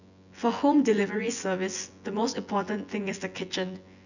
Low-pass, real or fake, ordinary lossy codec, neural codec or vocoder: 7.2 kHz; fake; none; vocoder, 24 kHz, 100 mel bands, Vocos